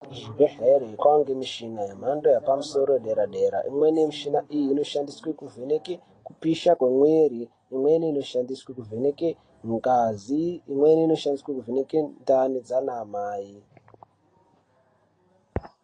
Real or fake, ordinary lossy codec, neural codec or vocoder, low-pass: real; AAC, 32 kbps; none; 9.9 kHz